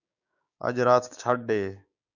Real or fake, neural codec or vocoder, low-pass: fake; codec, 16 kHz, 6 kbps, DAC; 7.2 kHz